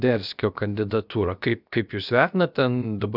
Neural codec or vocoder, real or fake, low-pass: codec, 16 kHz, about 1 kbps, DyCAST, with the encoder's durations; fake; 5.4 kHz